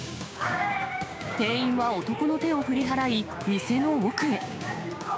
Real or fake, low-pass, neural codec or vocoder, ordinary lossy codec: fake; none; codec, 16 kHz, 6 kbps, DAC; none